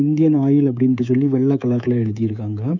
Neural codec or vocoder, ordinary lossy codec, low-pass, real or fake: codec, 24 kHz, 3.1 kbps, DualCodec; none; 7.2 kHz; fake